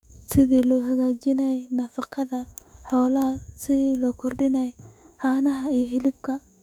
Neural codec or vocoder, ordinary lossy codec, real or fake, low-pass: autoencoder, 48 kHz, 32 numbers a frame, DAC-VAE, trained on Japanese speech; none; fake; 19.8 kHz